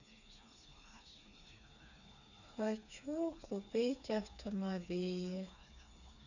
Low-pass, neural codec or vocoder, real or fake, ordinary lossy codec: 7.2 kHz; codec, 16 kHz, 4 kbps, FreqCodec, smaller model; fake; none